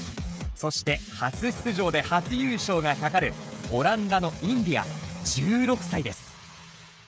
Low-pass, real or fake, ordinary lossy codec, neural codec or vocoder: none; fake; none; codec, 16 kHz, 8 kbps, FreqCodec, smaller model